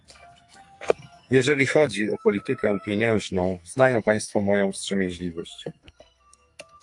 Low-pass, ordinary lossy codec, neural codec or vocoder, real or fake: 10.8 kHz; AAC, 64 kbps; codec, 44.1 kHz, 2.6 kbps, SNAC; fake